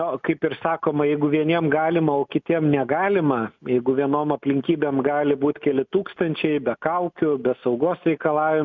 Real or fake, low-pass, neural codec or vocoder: real; 7.2 kHz; none